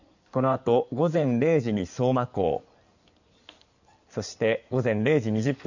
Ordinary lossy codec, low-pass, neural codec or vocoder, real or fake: none; 7.2 kHz; codec, 16 kHz in and 24 kHz out, 2.2 kbps, FireRedTTS-2 codec; fake